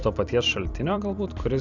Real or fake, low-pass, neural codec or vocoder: fake; 7.2 kHz; vocoder, 44.1 kHz, 128 mel bands every 256 samples, BigVGAN v2